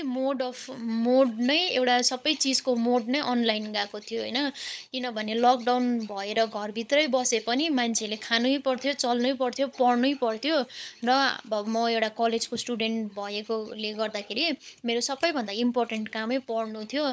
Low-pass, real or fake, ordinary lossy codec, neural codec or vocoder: none; fake; none; codec, 16 kHz, 16 kbps, FunCodec, trained on LibriTTS, 50 frames a second